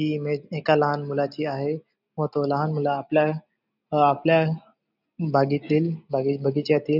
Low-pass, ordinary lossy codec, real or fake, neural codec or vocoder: 5.4 kHz; none; real; none